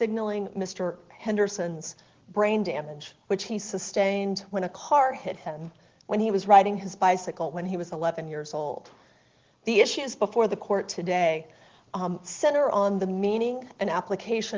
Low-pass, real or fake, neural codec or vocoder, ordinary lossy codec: 7.2 kHz; real; none; Opus, 16 kbps